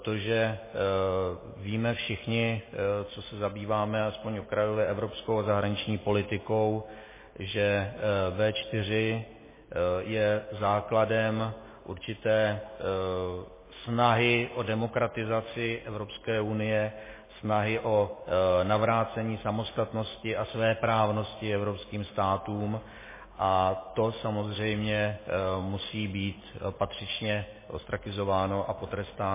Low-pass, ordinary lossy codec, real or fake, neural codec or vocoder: 3.6 kHz; MP3, 16 kbps; real; none